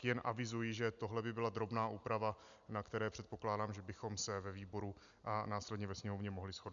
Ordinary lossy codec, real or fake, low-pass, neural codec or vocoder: MP3, 96 kbps; real; 7.2 kHz; none